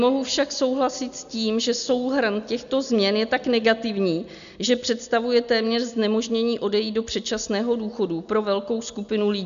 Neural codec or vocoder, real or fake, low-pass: none; real; 7.2 kHz